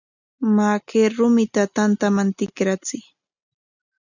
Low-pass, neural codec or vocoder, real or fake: 7.2 kHz; none; real